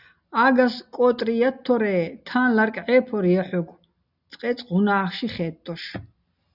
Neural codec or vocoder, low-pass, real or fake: none; 5.4 kHz; real